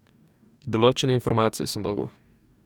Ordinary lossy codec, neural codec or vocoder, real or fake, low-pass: none; codec, 44.1 kHz, 2.6 kbps, DAC; fake; 19.8 kHz